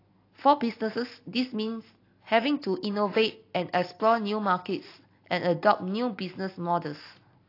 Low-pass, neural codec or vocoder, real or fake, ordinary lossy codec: 5.4 kHz; none; real; AAC, 32 kbps